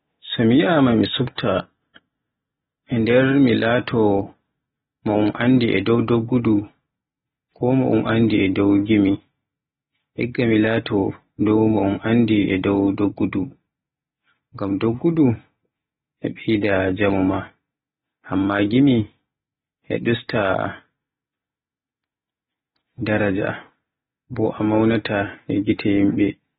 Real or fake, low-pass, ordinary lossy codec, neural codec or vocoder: real; 7.2 kHz; AAC, 16 kbps; none